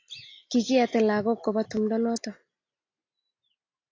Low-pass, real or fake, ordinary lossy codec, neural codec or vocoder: 7.2 kHz; real; AAC, 32 kbps; none